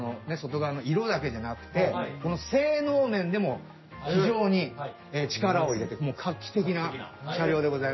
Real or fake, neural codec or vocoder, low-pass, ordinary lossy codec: real; none; 7.2 kHz; MP3, 24 kbps